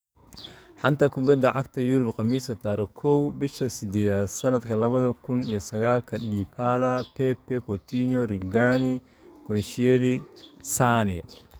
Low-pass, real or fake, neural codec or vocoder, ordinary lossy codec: none; fake; codec, 44.1 kHz, 2.6 kbps, SNAC; none